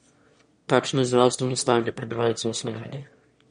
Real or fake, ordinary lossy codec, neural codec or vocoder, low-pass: fake; MP3, 48 kbps; autoencoder, 22.05 kHz, a latent of 192 numbers a frame, VITS, trained on one speaker; 9.9 kHz